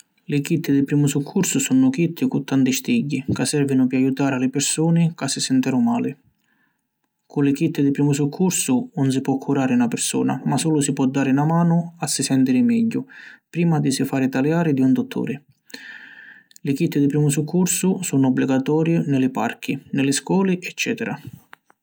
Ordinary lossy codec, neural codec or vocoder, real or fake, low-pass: none; none; real; none